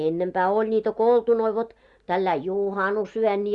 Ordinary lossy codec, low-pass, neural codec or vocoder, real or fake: Opus, 64 kbps; 10.8 kHz; none; real